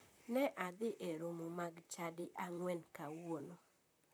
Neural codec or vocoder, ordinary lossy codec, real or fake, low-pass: vocoder, 44.1 kHz, 128 mel bands, Pupu-Vocoder; none; fake; none